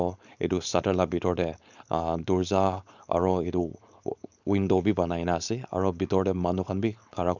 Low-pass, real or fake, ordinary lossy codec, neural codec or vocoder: 7.2 kHz; fake; none; codec, 16 kHz, 4.8 kbps, FACodec